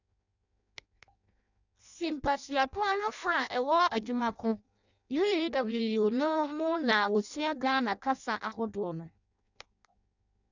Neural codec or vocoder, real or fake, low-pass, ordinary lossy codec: codec, 16 kHz in and 24 kHz out, 0.6 kbps, FireRedTTS-2 codec; fake; 7.2 kHz; none